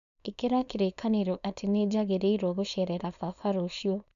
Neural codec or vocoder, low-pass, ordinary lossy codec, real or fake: codec, 16 kHz, 4.8 kbps, FACodec; 7.2 kHz; none; fake